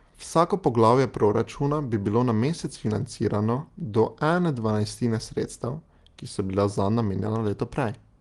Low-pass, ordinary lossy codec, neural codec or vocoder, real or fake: 10.8 kHz; Opus, 24 kbps; none; real